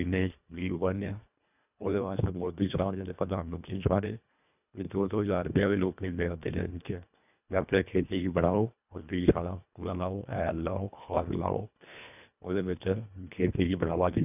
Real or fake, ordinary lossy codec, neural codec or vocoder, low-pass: fake; none; codec, 24 kHz, 1.5 kbps, HILCodec; 3.6 kHz